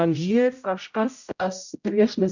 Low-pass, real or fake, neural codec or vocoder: 7.2 kHz; fake; codec, 16 kHz, 0.5 kbps, X-Codec, HuBERT features, trained on general audio